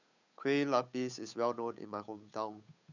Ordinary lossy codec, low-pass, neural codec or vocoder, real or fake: none; 7.2 kHz; codec, 16 kHz, 8 kbps, FunCodec, trained on Chinese and English, 25 frames a second; fake